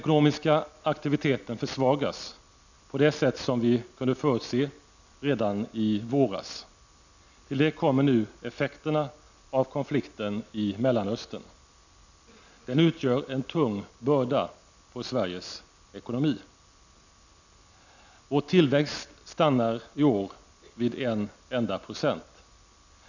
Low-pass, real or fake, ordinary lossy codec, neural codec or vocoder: 7.2 kHz; real; none; none